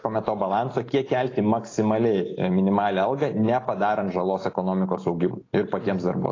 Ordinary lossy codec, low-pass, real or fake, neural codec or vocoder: AAC, 32 kbps; 7.2 kHz; real; none